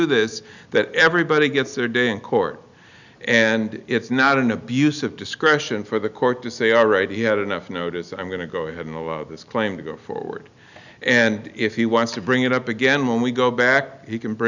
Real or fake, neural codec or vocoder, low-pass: real; none; 7.2 kHz